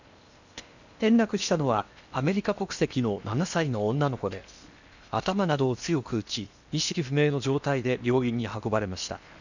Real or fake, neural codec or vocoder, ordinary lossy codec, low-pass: fake; codec, 16 kHz in and 24 kHz out, 0.8 kbps, FocalCodec, streaming, 65536 codes; none; 7.2 kHz